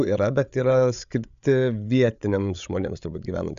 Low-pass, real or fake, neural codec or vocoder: 7.2 kHz; fake; codec, 16 kHz, 8 kbps, FreqCodec, larger model